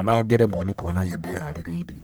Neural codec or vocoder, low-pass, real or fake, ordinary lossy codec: codec, 44.1 kHz, 1.7 kbps, Pupu-Codec; none; fake; none